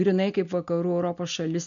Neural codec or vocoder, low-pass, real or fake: none; 7.2 kHz; real